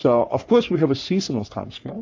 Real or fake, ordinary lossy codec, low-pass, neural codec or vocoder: fake; AAC, 48 kbps; 7.2 kHz; codec, 44.1 kHz, 3.4 kbps, Pupu-Codec